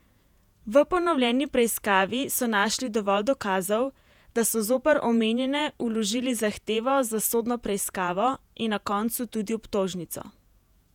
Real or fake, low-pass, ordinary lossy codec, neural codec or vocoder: fake; 19.8 kHz; none; vocoder, 48 kHz, 128 mel bands, Vocos